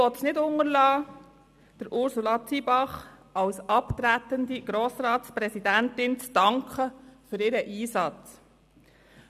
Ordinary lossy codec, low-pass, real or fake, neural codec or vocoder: none; 14.4 kHz; real; none